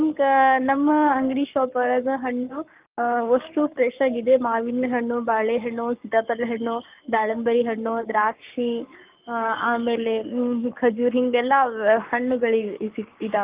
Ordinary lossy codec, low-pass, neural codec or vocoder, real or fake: Opus, 24 kbps; 3.6 kHz; codec, 44.1 kHz, 7.8 kbps, Pupu-Codec; fake